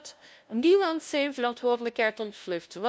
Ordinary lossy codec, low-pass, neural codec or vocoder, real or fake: none; none; codec, 16 kHz, 0.5 kbps, FunCodec, trained on LibriTTS, 25 frames a second; fake